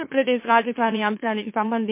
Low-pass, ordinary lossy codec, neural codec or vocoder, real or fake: 3.6 kHz; MP3, 24 kbps; autoencoder, 44.1 kHz, a latent of 192 numbers a frame, MeloTTS; fake